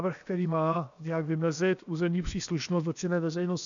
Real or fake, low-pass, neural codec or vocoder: fake; 7.2 kHz; codec, 16 kHz, 0.7 kbps, FocalCodec